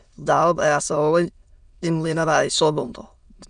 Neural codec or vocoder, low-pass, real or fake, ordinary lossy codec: autoencoder, 22.05 kHz, a latent of 192 numbers a frame, VITS, trained on many speakers; 9.9 kHz; fake; none